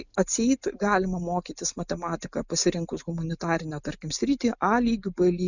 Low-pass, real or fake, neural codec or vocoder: 7.2 kHz; real; none